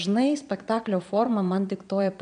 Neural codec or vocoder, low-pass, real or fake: vocoder, 22.05 kHz, 80 mel bands, Vocos; 9.9 kHz; fake